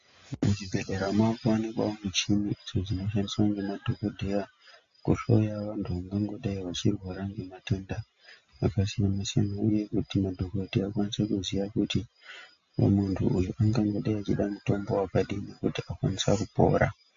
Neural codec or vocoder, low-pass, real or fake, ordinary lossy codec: none; 7.2 kHz; real; MP3, 48 kbps